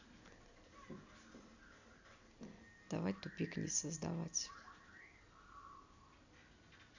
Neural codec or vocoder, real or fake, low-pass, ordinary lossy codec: none; real; 7.2 kHz; AAC, 48 kbps